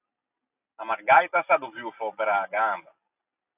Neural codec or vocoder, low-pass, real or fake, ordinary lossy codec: none; 3.6 kHz; real; AAC, 32 kbps